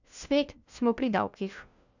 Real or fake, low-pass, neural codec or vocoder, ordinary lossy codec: fake; 7.2 kHz; codec, 16 kHz, 1 kbps, FunCodec, trained on LibriTTS, 50 frames a second; none